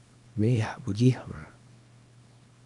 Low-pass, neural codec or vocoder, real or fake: 10.8 kHz; codec, 24 kHz, 0.9 kbps, WavTokenizer, small release; fake